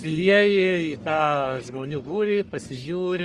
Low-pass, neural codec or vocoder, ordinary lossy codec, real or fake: 10.8 kHz; codec, 44.1 kHz, 1.7 kbps, Pupu-Codec; Opus, 32 kbps; fake